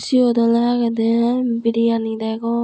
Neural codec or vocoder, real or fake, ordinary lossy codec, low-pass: none; real; none; none